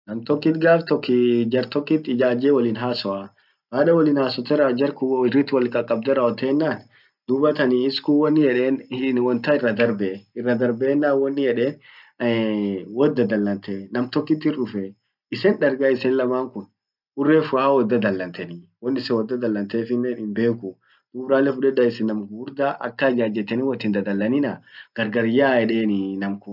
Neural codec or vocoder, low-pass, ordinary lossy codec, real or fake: none; 5.4 kHz; none; real